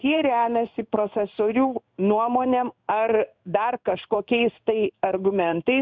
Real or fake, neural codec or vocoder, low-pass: fake; codec, 16 kHz in and 24 kHz out, 1 kbps, XY-Tokenizer; 7.2 kHz